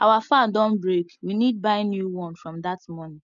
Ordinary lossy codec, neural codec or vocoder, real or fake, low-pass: MP3, 96 kbps; none; real; 7.2 kHz